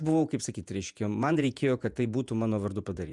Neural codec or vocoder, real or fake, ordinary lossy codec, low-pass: vocoder, 48 kHz, 128 mel bands, Vocos; fake; MP3, 96 kbps; 10.8 kHz